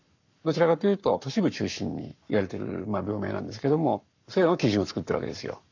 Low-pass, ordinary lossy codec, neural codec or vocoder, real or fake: 7.2 kHz; none; codec, 44.1 kHz, 7.8 kbps, Pupu-Codec; fake